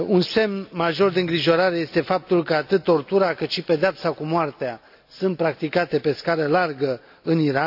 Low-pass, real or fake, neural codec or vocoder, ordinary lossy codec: 5.4 kHz; real; none; AAC, 48 kbps